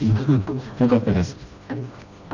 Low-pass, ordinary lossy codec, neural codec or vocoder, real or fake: 7.2 kHz; none; codec, 16 kHz, 1 kbps, FreqCodec, smaller model; fake